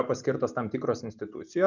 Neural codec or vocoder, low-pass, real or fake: none; 7.2 kHz; real